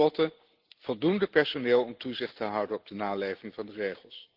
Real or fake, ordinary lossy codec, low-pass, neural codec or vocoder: real; Opus, 16 kbps; 5.4 kHz; none